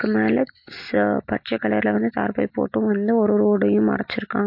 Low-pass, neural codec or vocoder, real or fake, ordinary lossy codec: 5.4 kHz; none; real; MP3, 32 kbps